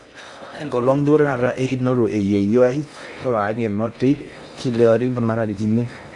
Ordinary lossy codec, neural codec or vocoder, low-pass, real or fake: AAC, 64 kbps; codec, 16 kHz in and 24 kHz out, 0.6 kbps, FocalCodec, streaming, 4096 codes; 10.8 kHz; fake